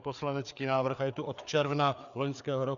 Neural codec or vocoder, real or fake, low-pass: codec, 16 kHz, 4 kbps, FreqCodec, larger model; fake; 7.2 kHz